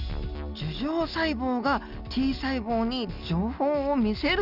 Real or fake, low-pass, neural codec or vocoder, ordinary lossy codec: real; 5.4 kHz; none; none